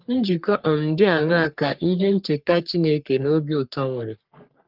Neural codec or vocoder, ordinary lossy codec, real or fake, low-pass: codec, 44.1 kHz, 3.4 kbps, Pupu-Codec; Opus, 32 kbps; fake; 5.4 kHz